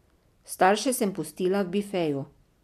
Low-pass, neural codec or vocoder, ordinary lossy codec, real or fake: 14.4 kHz; none; none; real